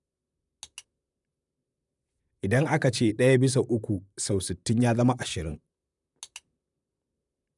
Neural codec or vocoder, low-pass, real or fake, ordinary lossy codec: vocoder, 44.1 kHz, 128 mel bands, Pupu-Vocoder; 10.8 kHz; fake; none